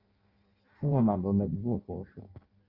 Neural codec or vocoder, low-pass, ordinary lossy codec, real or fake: codec, 16 kHz in and 24 kHz out, 0.6 kbps, FireRedTTS-2 codec; 5.4 kHz; Opus, 24 kbps; fake